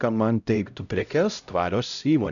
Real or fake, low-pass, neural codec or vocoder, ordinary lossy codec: fake; 7.2 kHz; codec, 16 kHz, 0.5 kbps, X-Codec, HuBERT features, trained on LibriSpeech; MP3, 96 kbps